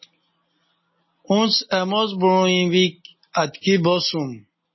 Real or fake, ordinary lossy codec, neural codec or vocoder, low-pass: real; MP3, 24 kbps; none; 7.2 kHz